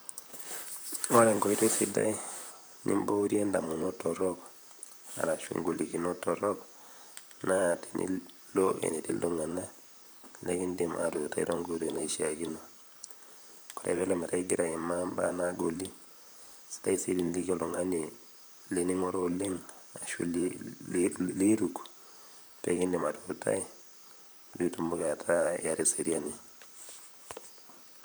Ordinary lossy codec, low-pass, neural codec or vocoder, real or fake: none; none; vocoder, 44.1 kHz, 128 mel bands, Pupu-Vocoder; fake